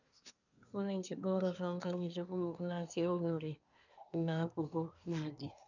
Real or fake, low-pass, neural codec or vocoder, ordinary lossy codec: fake; 7.2 kHz; codec, 24 kHz, 1 kbps, SNAC; none